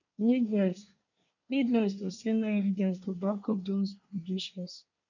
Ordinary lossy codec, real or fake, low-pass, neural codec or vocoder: none; fake; 7.2 kHz; codec, 24 kHz, 1 kbps, SNAC